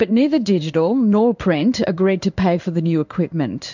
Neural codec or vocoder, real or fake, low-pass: codec, 16 kHz in and 24 kHz out, 1 kbps, XY-Tokenizer; fake; 7.2 kHz